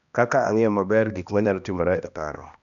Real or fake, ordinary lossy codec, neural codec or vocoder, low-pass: fake; none; codec, 16 kHz, 1 kbps, X-Codec, HuBERT features, trained on balanced general audio; 7.2 kHz